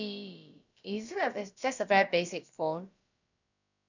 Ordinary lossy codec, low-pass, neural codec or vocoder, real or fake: none; 7.2 kHz; codec, 16 kHz, about 1 kbps, DyCAST, with the encoder's durations; fake